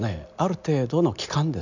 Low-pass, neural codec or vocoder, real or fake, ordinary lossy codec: 7.2 kHz; none; real; none